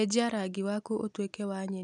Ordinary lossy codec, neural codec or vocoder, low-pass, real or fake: none; none; 10.8 kHz; real